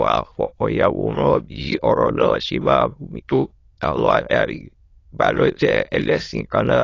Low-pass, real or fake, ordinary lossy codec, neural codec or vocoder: 7.2 kHz; fake; AAC, 32 kbps; autoencoder, 22.05 kHz, a latent of 192 numbers a frame, VITS, trained on many speakers